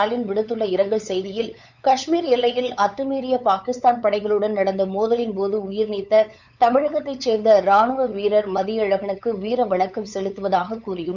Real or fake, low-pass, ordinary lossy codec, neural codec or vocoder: fake; 7.2 kHz; none; codec, 16 kHz, 8 kbps, FunCodec, trained on LibriTTS, 25 frames a second